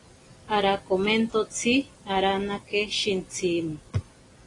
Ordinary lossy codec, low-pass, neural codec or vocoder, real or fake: AAC, 32 kbps; 10.8 kHz; none; real